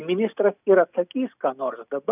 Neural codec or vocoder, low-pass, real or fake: none; 3.6 kHz; real